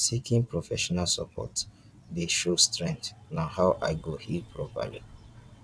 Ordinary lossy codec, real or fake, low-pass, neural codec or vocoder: none; fake; none; vocoder, 22.05 kHz, 80 mel bands, WaveNeXt